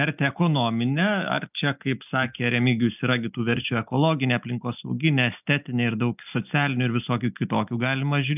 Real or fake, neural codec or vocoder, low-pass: real; none; 3.6 kHz